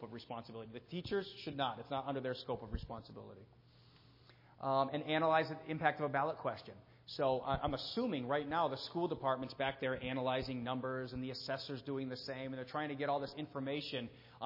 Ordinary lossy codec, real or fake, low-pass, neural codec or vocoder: MP3, 24 kbps; real; 5.4 kHz; none